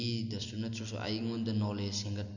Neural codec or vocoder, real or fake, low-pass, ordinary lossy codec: none; real; 7.2 kHz; none